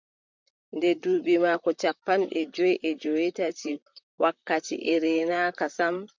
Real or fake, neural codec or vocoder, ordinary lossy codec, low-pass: fake; vocoder, 44.1 kHz, 128 mel bands, Pupu-Vocoder; MP3, 64 kbps; 7.2 kHz